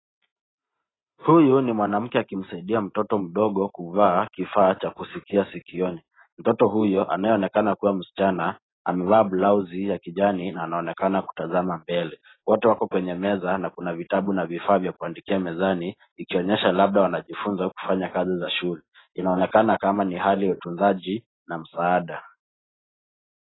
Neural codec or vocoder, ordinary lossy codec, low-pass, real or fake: none; AAC, 16 kbps; 7.2 kHz; real